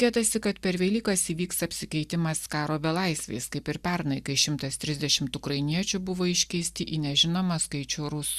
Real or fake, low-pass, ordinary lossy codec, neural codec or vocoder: real; 14.4 kHz; Opus, 64 kbps; none